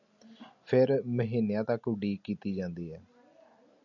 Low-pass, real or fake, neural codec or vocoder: 7.2 kHz; real; none